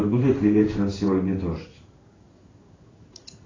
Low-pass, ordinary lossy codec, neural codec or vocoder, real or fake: 7.2 kHz; AAC, 32 kbps; codec, 16 kHz in and 24 kHz out, 1 kbps, XY-Tokenizer; fake